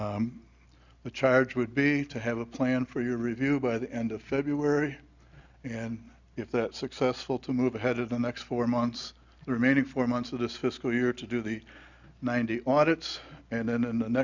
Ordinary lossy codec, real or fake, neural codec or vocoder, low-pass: Opus, 64 kbps; real; none; 7.2 kHz